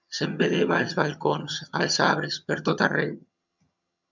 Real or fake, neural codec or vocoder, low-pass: fake; vocoder, 22.05 kHz, 80 mel bands, HiFi-GAN; 7.2 kHz